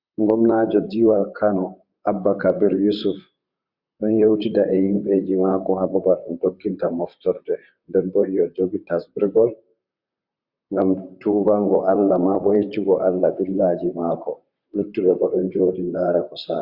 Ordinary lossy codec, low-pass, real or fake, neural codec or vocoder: Opus, 64 kbps; 5.4 kHz; fake; vocoder, 44.1 kHz, 128 mel bands, Pupu-Vocoder